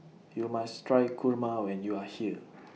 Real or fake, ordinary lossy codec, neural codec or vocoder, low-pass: real; none; none; none